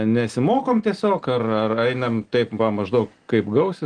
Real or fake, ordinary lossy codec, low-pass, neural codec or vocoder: real; Opus, 24 kbps; 9.9 kHz; none